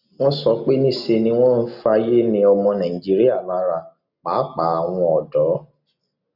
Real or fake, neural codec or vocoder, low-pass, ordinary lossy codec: real; none; 5.4 kHz; none